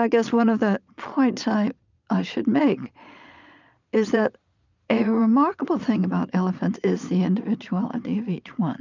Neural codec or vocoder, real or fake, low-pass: vocoder, 22.05 kHz, 80 mel bands, Vocos; fake; 7.2 kHz